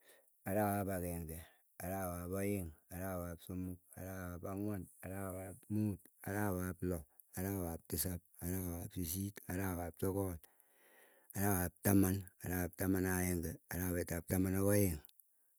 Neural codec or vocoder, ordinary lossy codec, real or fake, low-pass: none; none; real; none